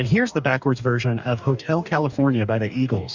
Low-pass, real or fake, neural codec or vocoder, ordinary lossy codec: 7.2 kHz; fake; codec, 44.1 kHz, 2.6 kbps, DAC; Opus, 64 kbps